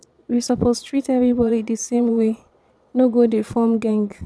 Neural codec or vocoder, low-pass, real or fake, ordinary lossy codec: vocoder, 22.05 kHz, 80 mel bands, Vocos; none; fake; none